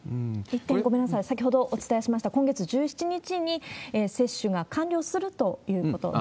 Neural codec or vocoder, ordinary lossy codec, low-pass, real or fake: none; none; none; real